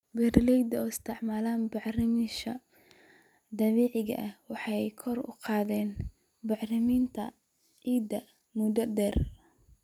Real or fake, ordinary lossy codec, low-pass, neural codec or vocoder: real; none; 19.8 kHz; none